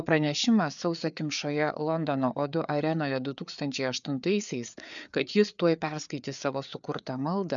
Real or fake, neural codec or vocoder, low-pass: fake; codec, 16 kHz, 4 kbps, FreqCodec, larger model; 7.2 kHz